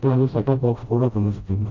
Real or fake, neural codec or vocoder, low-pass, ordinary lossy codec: fake; codec, 16 kHz, 0.5 kbps, FreqCodec, smaller model; 7.2 kHz; AAC, 48 kbps